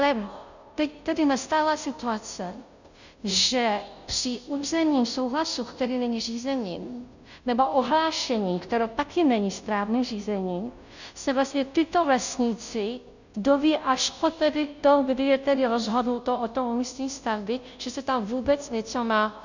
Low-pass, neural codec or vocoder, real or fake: 7.2 kHz; codec, 16 kHz, 0.5 kbps, FunCodec, trained on Chinese and English, 25 frames a second; fake